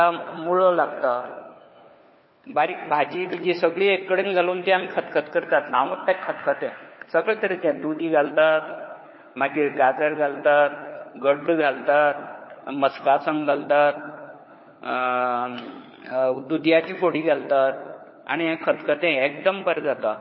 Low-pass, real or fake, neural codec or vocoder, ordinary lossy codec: 7.2 kHz; fake; codec, 16 kHz, 4 kbps, FunCodec, trained on LibriTTS, 50 frames a second; MP3, 24 kbps